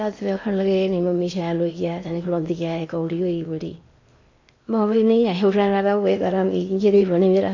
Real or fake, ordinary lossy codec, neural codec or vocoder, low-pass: fake; AAC, 48 kbps; codec, 16 kHz in and 24 kHz out, 0.8 kbps, FocalCodec, streaming, 65536 codes; 7.2 kHz